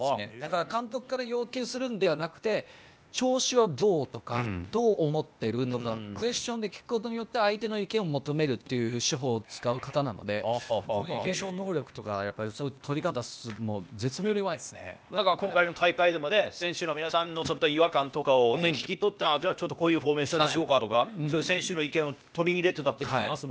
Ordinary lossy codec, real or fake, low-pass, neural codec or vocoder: none; fake; none; codec, 16 kHz, 0.8 kbps, ZipCodec